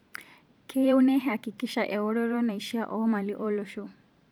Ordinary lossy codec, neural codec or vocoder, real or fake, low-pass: none; vocoder, 44.1 kHz, 128 mel bands every 512 samples, BigVGAN v2; fake; 19.8 kHz